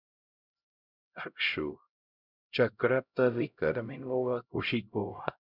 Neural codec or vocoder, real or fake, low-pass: codec, 16 kHz, 0.5 kbps, X-Codec, HuBERT features, trained on LibriSpeech; fake; 5.4 kHz